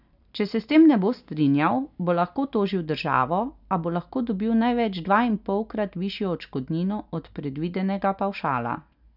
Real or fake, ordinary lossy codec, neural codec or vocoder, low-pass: real; none; none; 5.4 kHz